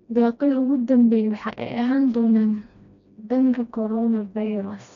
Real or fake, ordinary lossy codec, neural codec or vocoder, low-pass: fake; none; codec, 16 kHz, 1 kbps, FreqCodec, smaller model; 7.2 kHz